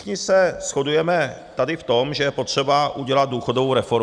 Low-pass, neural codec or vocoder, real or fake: 9.9 kHz; none; real